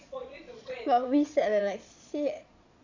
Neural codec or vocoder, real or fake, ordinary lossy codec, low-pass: vocoder, 22.05 kHz, 80 mel bands, Vocos; fake; AAC, 48 kbps; 7.2 kHz